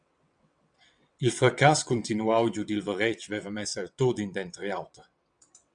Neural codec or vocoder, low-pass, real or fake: vocoder, 22.05 kHz, 80 mel bands, WaveNeXt; 9.9 kHz; fake